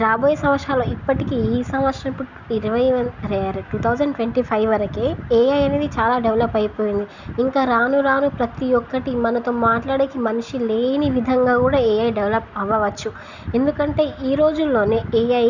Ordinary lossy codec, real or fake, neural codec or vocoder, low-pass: none; real; none; 7.2 kHz